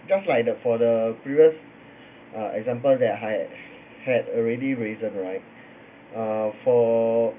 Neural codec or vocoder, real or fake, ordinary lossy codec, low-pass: none; real; none; 3.6 kHz